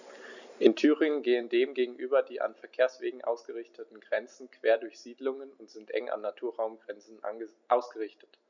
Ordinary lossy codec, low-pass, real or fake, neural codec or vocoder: none; 7.2 kHz; real; none